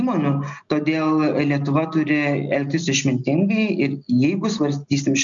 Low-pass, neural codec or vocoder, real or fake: 7.2 kHz; none; real